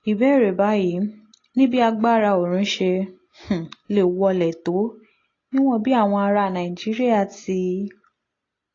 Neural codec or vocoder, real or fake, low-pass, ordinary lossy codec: none; real; 7.2 kHz; AAC, 32 kbps